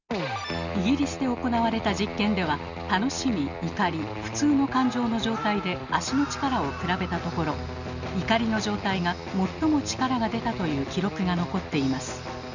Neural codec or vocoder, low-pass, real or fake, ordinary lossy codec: none; 7.2 kHz; real; none